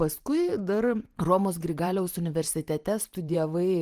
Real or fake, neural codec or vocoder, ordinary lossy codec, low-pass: fake; vocoder, 44.1 kHz, 128 mel bands every 512 samples, BigVGAN v2; Opus, 24 kbps; 14.4 kHz